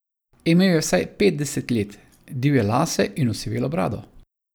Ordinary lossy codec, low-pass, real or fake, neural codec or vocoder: none; none; real; none